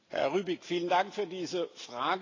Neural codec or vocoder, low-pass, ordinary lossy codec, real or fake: none; 7.2 kHz; AAC, 32 kbps; real